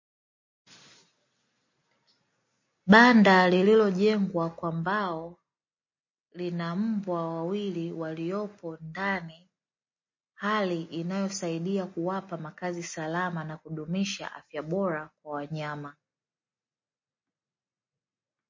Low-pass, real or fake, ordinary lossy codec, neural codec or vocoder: 7.2 kHz; real; MP3, 32 kbps; none